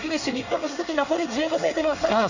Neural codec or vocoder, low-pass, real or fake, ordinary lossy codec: codec, 24 kHz, 1 kbps, SNAC; 7.2 kHz; fake; none